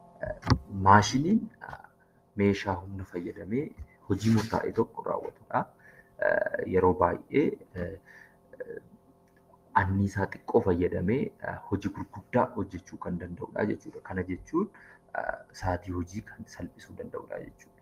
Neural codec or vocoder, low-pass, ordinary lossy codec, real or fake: none; 14.4 kHz; Opus, 24 kbps; real